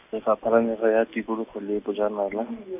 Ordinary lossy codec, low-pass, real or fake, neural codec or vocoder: none; 3.6 kHz; real; none